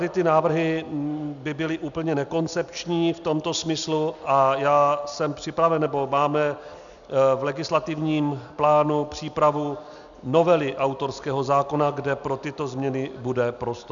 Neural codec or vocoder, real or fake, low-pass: none; real; 7.2 kHz